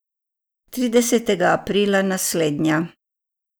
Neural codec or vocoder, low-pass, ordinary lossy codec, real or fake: none; none; none; real